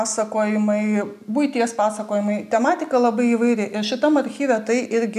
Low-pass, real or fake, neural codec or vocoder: 14.4 kHz; real; none